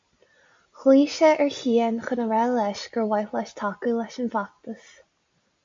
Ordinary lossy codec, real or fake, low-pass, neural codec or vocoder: MP3, 48 kbps; real; 7.2 kHz; none